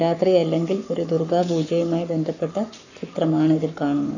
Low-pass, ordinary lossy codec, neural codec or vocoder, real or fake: 7.2 kHz; none; codec, 44.1 kHz, 7.8 kbps, Pupu-Codec; fake